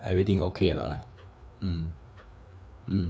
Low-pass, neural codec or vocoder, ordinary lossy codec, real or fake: none; codec, 16 kHz, 2 kbps, FreqCodec, larger model; none; fake